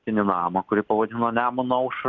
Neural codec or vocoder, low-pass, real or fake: none; 7.2 kHz; real